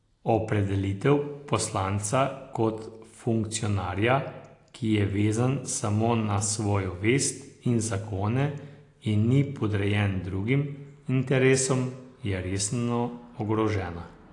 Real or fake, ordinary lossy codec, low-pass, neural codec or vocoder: real; AAC, 48 kbps; 10.8 kHz; none